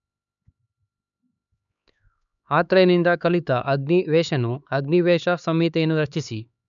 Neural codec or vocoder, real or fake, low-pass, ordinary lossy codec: codec, 16 kHz, 4 kbps, X-Codec, HuBERT features, trained on LibriSpeech; fake; 7.2 kHz; none